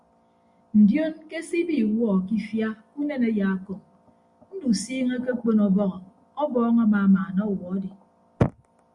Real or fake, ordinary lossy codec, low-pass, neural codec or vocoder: real; Opus, 64 kbps; 10.8 kHz; none